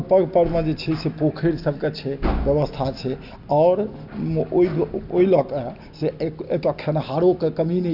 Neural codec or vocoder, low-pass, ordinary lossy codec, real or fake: none; 5.4 kHz; Opus, 64 kbps; real